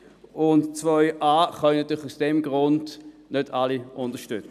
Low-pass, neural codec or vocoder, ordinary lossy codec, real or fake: 14.4 kHz; none; none; real